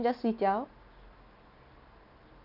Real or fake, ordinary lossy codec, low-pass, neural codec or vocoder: real; none; 5.4 kHz; none